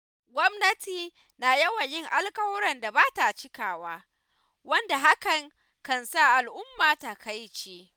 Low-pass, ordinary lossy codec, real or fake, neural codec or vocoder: none; none; real; none